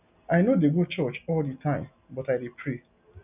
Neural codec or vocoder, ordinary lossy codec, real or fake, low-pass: none; none; real; 3.6 kHz